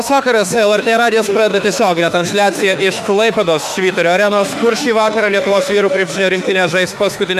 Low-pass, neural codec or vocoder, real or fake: 14.4 kHz; autoencoder, 48 kHz, 32 numbers a frame, DAC-VAE, trained on Japanese speech; fake